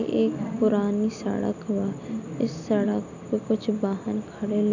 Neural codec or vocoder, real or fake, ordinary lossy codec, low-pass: none; real; none; 7.2 kHz